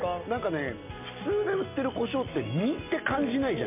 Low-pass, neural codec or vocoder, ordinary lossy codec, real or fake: 3.6 kHz; none; MP3, 32 kbps; real